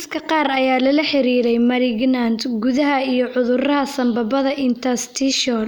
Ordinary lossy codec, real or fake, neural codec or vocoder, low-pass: none; real; none; none